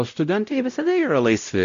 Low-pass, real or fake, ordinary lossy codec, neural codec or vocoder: 7.2 kHz; fake; MP3, 48 kbps; codec, 16 kHz, 0.5 kbps, X-Codec, WavLM features, trained on Multilingual LibriSpeech